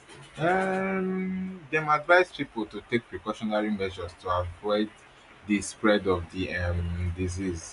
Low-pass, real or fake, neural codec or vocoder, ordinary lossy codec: 10.8 kHz; real; none; none